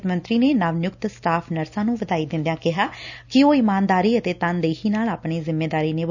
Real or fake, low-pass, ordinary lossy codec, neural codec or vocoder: real; 7.2 kHz; none; none